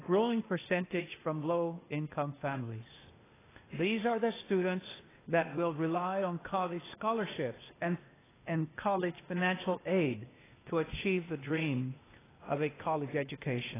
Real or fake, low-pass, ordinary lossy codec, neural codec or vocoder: fake; 3.6 kHz; AAC, 16 kbps; codec, 16 kHz, 0.8 kbps, ZipCodec